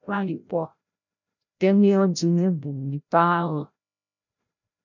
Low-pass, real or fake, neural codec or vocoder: 7.2 kHz; fake; codec, 16 kHz, 0.5 kbps, FreqCodec, larger model